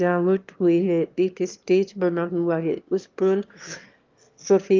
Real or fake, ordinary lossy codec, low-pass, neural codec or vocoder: fake; Opus, 32 kbps; 7.2 kHz; autoencoder, 22.05 kHz, a latent of 192 numbers a frame, VITS, trained on one speaker